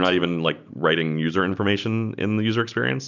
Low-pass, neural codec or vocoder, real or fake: 7.2 kHz; none; real